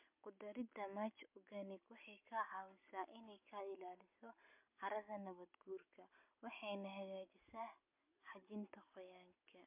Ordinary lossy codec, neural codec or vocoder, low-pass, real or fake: MP3, 32 kbps; none; 3.6 kHz; real